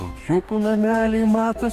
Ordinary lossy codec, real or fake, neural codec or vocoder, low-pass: Opus, 64 kbps; fake; codec, 44.1 kHz, 2.6 kbps, DAC; 14.4 kHz